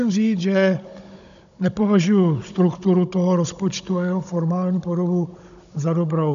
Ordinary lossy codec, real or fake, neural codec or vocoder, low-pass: AAC, 96 kbps; fake; codec, 16 kHz, 16 kbps, FunCodec, trained on Chinese and English, 50 frames a second; 7.2 kHz